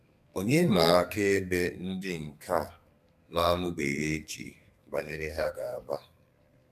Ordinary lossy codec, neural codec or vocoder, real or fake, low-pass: none; codec, 32 kHz, 1.9 kbps, SNAC; fake; 14.4 kHz